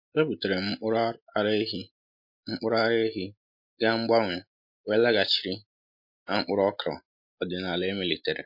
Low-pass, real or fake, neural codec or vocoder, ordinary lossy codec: 5.4 kHz; real; none; MP3, 32 kbps